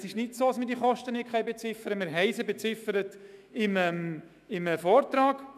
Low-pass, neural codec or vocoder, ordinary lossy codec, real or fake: 14.4 kHz; autoencoder, 48 kHz, 128 numbers a frame, DAC-VAE, trained on Japanese speech; none; fake